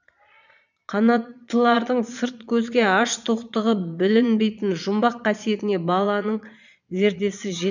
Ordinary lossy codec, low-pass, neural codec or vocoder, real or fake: none; 7.2 kHz; vocoder, 22.05 kHz, 80 mel bands, Vocos; fake